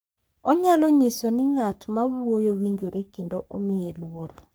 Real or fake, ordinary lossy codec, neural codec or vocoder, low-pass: fake; none; codec, 44.1 kHz, 3.4 kbps, Pupu-Codec; none